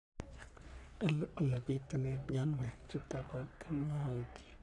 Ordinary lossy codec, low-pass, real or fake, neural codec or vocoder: none; 10.8 kHz; fake; codec, 44.1 kHz, 3.4 kbps, Pupu-Codec